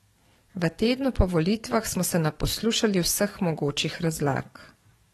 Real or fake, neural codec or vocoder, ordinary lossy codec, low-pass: fake; codec, 44.1 kHz, 7.8 kbps, DAC; AAC, 32 kbps; 19.8 kHz